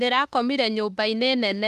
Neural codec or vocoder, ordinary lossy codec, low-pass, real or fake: autoencoder, 48 kHz, 32 numbers a frame, DAC-VAE, trained on Japanese speech; Opus, 24 kbps; 14.4 kHz; fake